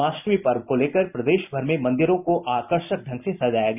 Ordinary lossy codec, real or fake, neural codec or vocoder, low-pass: none; real; none; 3.6 kHz